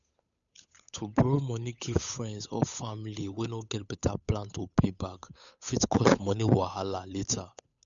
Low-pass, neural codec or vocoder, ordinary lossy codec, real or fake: 7.2 kHz; codec, 16 kHz, 8 kbps, FunCodec, trained on Chinese and English, 25 frames a second; none; fake